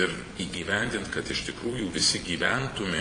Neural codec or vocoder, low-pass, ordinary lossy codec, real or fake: vocoder, 22.05 kHz, 80 mel bands, Vocos; 9.9 kHz; AAC, 32 kbps; fake